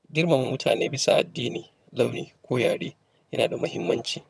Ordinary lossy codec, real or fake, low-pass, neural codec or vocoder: none; fake; none; vocoder, 22.05 kHz, 80 mel bands, HiFi-GAN